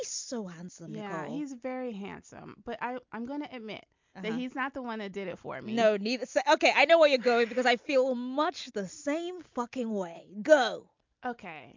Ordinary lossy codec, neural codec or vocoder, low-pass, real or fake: MP3, 64 kbps; none; 7.2 kHz; real